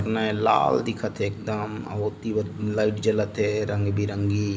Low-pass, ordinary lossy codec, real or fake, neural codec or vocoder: none; none; real; none